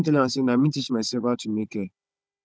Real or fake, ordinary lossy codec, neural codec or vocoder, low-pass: fake; none; codec, 16 kHz, 16 kbps, FunCodec, trained on Chinese and English, 50 frames a second; none